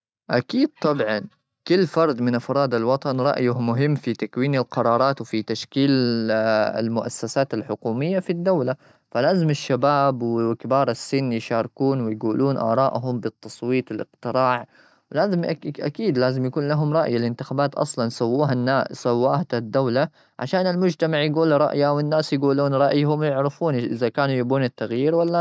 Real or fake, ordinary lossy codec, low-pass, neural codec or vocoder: real; none; none; none